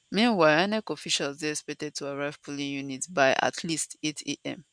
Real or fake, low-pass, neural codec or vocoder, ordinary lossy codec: real; 9.9 kHz; none; none